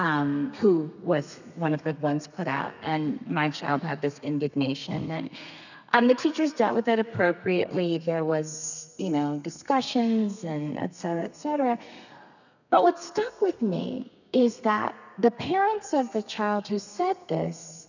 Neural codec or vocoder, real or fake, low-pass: codec, 32 kHz, 1.9 kbps, SNAC; fake; 7.2 kHz